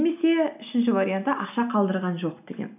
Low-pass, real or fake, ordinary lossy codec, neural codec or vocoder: 3.6 kHz; real; none; none